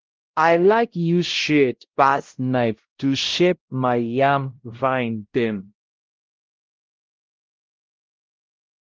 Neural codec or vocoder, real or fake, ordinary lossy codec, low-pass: codec, 16 kHz, 0.5 kbps, X-Codec, WavLM features, trained on Multilingual LibriSpeech; fake; Opus, 16 kbps; 7.2 kHz